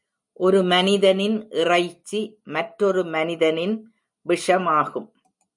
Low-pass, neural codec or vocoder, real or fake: 10.8 kHz; none; real